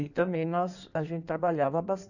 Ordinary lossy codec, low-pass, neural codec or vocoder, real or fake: none; 7.2 kHz; codec, 16 kHz in and 24 kHz out, 1.1 kbps, FireRedTTS-2 codec; fake